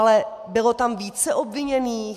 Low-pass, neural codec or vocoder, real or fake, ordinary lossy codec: 14.4 kHz; none; real; AAC, 96 kbps